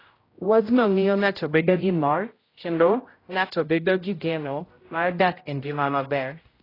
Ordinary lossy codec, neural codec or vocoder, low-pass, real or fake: AAC, 24 kbps; codec, 16 kHz, 0.5 kbps, X-Codec, HuBERT features, trained on general audio; 5.4 kHz; fake